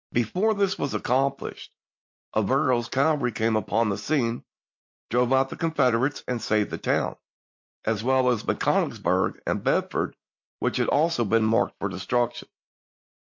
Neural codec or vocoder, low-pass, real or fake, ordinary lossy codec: none; 7.2 kHz; real; MP3, 48 kbps